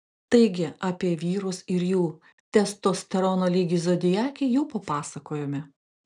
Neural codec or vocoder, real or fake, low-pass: none; real; 10.8 kHz